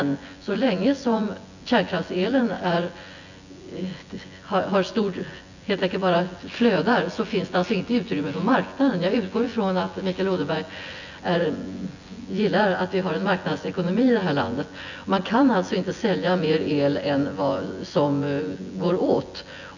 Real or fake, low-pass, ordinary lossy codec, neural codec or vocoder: fake; 7.2 kHz; none; vocoder, 24 kHz, 100 mel bands, Vocos